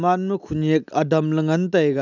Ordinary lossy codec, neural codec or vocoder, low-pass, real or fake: none; none; 7.2 kHz; real